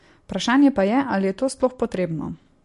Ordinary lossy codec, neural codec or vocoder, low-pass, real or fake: MP3, 48 kbps; none; 14.4 kHz; real